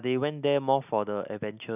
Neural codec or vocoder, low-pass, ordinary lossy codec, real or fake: none; 3.6 kHz; none; real